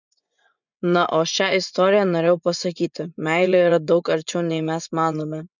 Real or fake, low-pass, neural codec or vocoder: fake; 7.2 kHz; vocoder, 24 kHz, 100 mel bands, Vocos